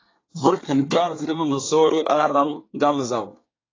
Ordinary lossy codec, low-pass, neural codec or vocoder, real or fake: AAC, 32 kbps; 7.2 kHz; codec, 24 kHz, 1 kbps, SNAC; fake